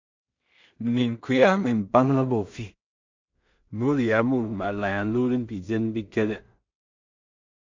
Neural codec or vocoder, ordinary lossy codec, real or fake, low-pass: codec, 16 kHz in and 24 kHz out, 0.4 kbps, LongCat-Audio-Codec, two codebook decoder; MP3, 64 kbps; fake; 7.2 kHz